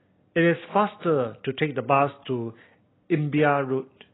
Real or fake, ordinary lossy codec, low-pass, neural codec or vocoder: real; AAC, 16 kbps; 7.2 kHz; none